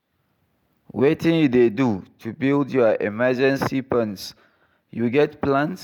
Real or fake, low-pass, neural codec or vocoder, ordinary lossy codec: real; 19.8 kHz; none; none